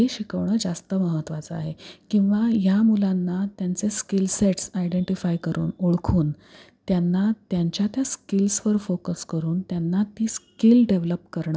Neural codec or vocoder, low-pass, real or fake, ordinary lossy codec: none; none; real; none